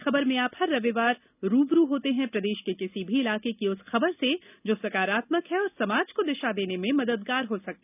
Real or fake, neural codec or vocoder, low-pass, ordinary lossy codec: real; none; 3.6 kHz; none